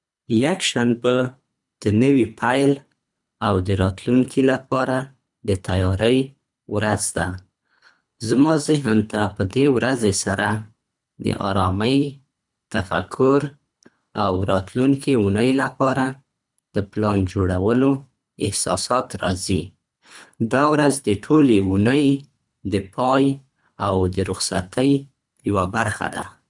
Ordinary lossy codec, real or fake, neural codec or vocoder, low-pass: none; fake; codec, 24 kHz, 3 kbps, HILCodec; 10.8 kHz